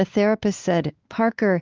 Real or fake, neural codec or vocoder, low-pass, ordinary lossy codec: fake; codec, 16 kHz, 4 kbps, X-Codec, WavLM features, trained on Multilingual LibriSpeech; 7.2 kHz; Opus, 32 kbps